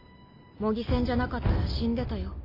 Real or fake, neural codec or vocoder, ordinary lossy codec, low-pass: real; none; MP3, 24 kbps; 5.4 kHz